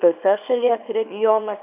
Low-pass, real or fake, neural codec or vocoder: 3.6 kHz; fake; codec, 24 kHz, 0.9 kbps, WavTokenizer, small release